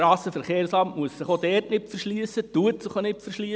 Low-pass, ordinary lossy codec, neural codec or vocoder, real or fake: none; none; none; real